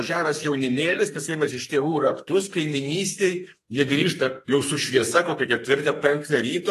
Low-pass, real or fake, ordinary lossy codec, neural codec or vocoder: 14.4 kHz; fake; AAC, 48 kbps; codec, 32 kHz, 1.9 kbps, SNAC